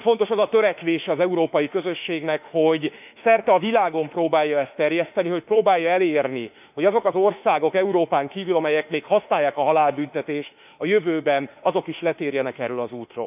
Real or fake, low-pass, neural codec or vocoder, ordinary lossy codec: fake; 3.6 kHz; autoencoder, 48 kHz, 32 numbers a frame, DAC-VAE, trained on Japanese speech; none